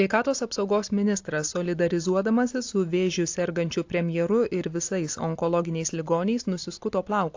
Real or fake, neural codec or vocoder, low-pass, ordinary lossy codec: real; none; 7.2 kHz; AAC, 48 kbps